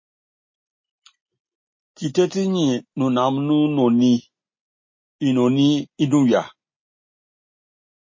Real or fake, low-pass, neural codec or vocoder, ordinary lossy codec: real; 7.2 kHz; none; MP3, 32 kbps